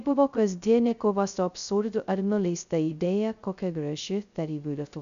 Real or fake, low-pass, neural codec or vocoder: fake; 7.2 kHz; codec, 16 kHz, 0.2 kbps, FocalCodec